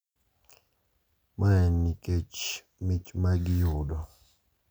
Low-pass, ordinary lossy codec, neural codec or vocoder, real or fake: none; none; none; real